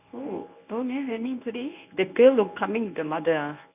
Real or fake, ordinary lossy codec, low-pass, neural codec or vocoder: fake; none; 3.6 kHz; codec, 24 kHz, 0.9 kbps, WavTokenizer, medium speech release version 1